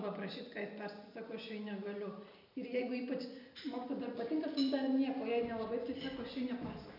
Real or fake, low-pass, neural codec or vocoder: real; 5.4 kHz; none